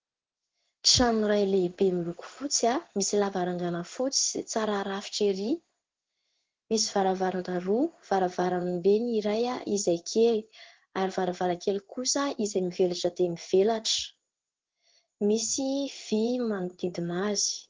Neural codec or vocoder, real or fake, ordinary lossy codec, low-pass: codec, 16 kHz in and 24 kHz out, 1 kbps, XY-Tokenizer; fake; Opus, 16 kbps; 7.2 kHz